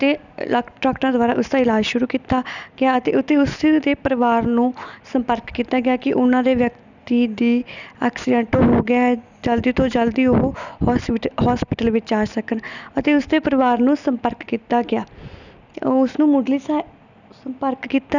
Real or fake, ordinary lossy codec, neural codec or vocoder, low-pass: real; none; none; 7.2 kHz